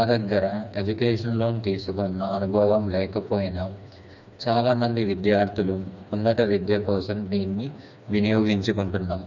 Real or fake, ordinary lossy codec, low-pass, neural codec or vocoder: fake; none; 7.2 kHz; codec, 16 kHz, 2 kbps, FreqCodec, smaller model